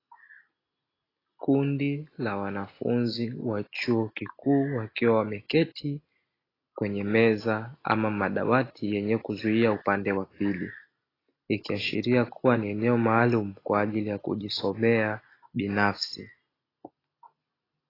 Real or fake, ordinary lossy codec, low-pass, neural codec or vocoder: real; AAC, 24 kbps; 5.4 kHz; none